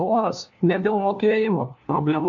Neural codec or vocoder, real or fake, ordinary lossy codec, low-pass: codec, 16 kHz, 1 kbps, FunCodec, trained on LibriTTS, 50 frames a second; fake; AAC, 64 kbps; 7.2 kHz